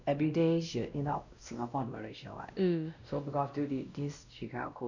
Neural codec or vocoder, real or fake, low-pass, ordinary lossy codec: codec, 16 kHz, 1 kbps, X-Codec, WavLM features, trained on Multilingual LibriSpeech; fake; 7.2 kHz; none